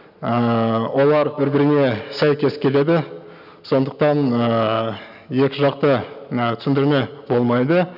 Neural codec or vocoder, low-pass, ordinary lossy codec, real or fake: none; 5.4 kHz; none; real